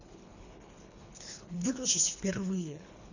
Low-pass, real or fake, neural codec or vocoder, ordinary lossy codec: 7.2 kHz; fake; codec, 24 kHz, 3 kbps, HILCodec; none